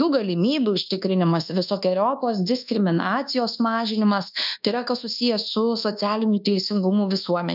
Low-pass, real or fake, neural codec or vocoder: 5.4 kHz; fake; codec, 24 kHz, 1.2 kbps, DualCodec